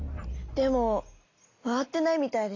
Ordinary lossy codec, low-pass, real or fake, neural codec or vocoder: AAC, 32 kbps; 7.2 kHz; real; none